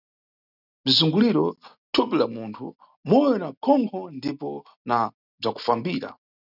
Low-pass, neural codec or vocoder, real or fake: 5.4 kHz; none; real